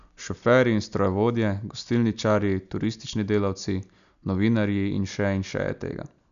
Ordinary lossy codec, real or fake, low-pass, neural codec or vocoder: none; real; 7.2 kHz; none